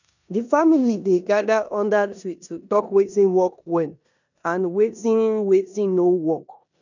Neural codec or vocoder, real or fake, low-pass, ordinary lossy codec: codec, 16 kHz in and 24 kHz out, 0.9 kbps, LongCat-Audio-Codec, four codebook decoder; fake; 7.2 kHz; none